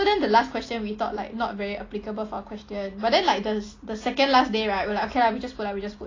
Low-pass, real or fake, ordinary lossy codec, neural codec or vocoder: 7.2 kHz; real; AAC, 32 kbps; none